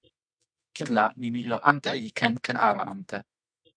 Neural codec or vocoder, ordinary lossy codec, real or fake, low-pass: codec, 24 kHz, 0.9 kbps, WavTokenizer, medium music audio release; MP3, 64 kbps; fake; 9.9 kHz